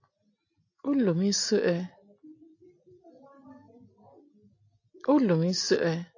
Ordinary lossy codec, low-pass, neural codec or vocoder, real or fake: AAC, 48 kbps; 7.2 kHz; none; real